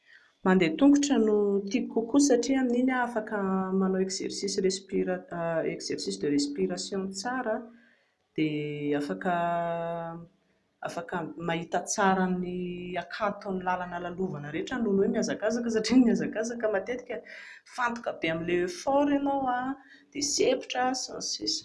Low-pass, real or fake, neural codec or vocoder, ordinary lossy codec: none; real; none; none